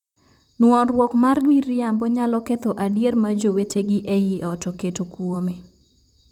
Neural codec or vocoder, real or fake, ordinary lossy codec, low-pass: vocoder, 44.1 kHz, 128 mel bands, Pupu-Vocoder; fake; none; 19.8 kHz